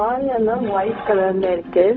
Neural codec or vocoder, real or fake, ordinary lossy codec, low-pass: codec, 16 kHz, 0.4 kbps, LongCat-Audio-Codec; fake; none; none